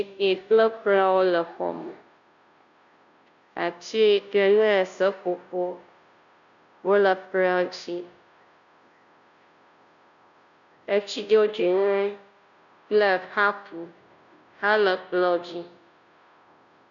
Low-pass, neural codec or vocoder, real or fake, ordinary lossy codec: 7.2 kHz; codec, 16 kHz, 0.5 kbps, FunCodec, trained on Chinese and English, 25 frames a second; fake; MP3, 96 kbps